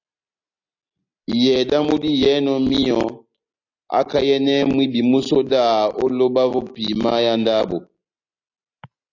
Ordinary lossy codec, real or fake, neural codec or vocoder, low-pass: AAC, 48 kbps; real; none; 7.2 kHz